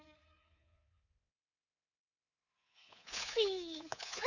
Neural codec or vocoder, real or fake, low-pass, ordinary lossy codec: codec, 24 kHz, 3.1 kbps, DualCodec; fake; 7.2 kHz; none